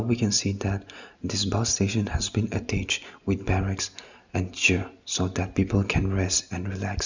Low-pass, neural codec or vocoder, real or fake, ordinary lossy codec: 7.2 kHz; none; real; none